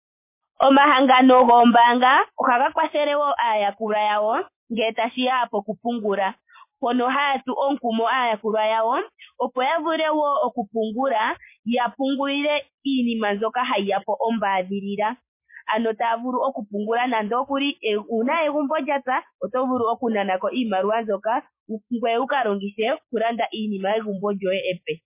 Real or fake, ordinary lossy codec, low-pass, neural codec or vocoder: real; MP3, 24 kbps; 3.6 kHz; none